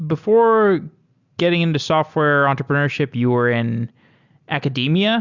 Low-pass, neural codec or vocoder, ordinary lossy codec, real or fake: 7.2 kHz; none; Opus, 64 kbps; real